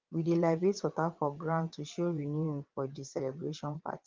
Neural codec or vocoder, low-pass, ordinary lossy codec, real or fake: vocoder, 22.05 kHz, 80 mel bands, WaveNeXt; 7.2 kHz; Opus, 32 kbps; fake